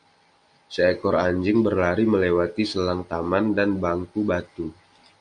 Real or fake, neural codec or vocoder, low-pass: real; none; 9.9 kHz